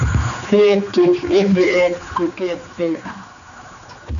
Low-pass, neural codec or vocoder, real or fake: 7.2 kHz; codec, 16 kHz, 4 kbps, X-Codec, HuBERT features, trained on general audio; fake